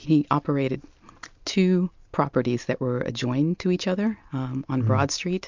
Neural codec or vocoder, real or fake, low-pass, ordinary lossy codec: none; real; 7.2 kHz; MP3, 64 kbps